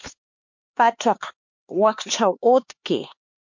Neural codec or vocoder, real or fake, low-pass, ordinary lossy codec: codec, 16 kHz, 2 kbps, X-Codec, HuBERT features, trained on LibriSpeech; fake; 7.2 kHz; MP3, 48 kbps